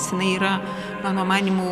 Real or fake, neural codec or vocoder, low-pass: real; none; 14.4 kHz